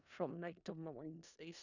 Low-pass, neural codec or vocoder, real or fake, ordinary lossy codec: 7.2 kHz; codec, 16 kHz in and 24 kHz out, 0.4 kbps, LongCat-Audio-Codec, four codebook decoder; fake; none